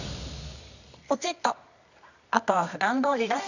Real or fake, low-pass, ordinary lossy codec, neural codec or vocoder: fake; 7.2 kHz; none; codec, 24 kHz, 0.9 kbps, WavTokenizer, medium music audio release